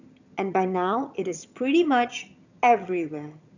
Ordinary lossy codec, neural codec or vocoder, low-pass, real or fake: none; vocoder, 22.05 kHz, 80 mel bands, HiFi-GAN; 7.2 kHz; fake